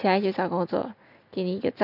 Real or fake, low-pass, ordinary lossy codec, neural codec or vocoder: real; 5.4 kHz; none; none